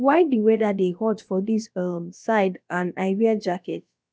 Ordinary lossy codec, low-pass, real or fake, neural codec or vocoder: none; none; fake; codec, 16 kHz, about 1 kbps, DyCAST, with the encoder's durations